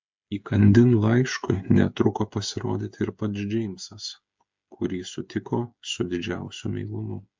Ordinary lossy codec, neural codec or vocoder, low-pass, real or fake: MP3, 64 kbps; codec, 16 kHz, 8 kbps, FreqCodec, smaller model; 7.2 kHz; fake